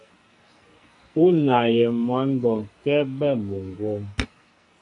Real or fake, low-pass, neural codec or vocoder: fake; 10.8 kHz; codec, 44.1 kHz, 2.6 kbps, SNAC